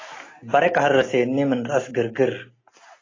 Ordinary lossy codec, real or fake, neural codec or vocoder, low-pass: AAC, 32 kbps; real; none; 7.2 kHz